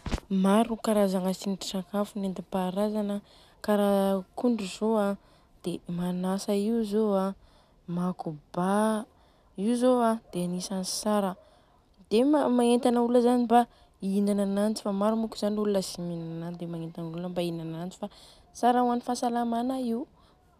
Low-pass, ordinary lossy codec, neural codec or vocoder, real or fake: 14.4 kHz; none; none; real